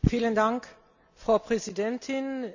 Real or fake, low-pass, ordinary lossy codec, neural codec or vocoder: real; 7.2 kHz; none; none